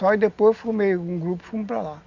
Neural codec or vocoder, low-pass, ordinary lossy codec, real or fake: none; 7.2 kHz; none; real